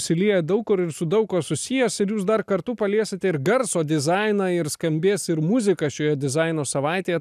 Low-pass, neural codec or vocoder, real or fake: 14.4 kHz; none; real